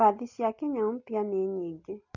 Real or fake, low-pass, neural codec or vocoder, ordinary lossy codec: real; 7.2 kHz; none; none